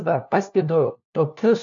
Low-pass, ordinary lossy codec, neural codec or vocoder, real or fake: 7.2 kHz; AAC, 64 kbps; codec, 16 kHz, 1 kbps, FunCodec, trained on LibriTTS, 50 frames a second; fake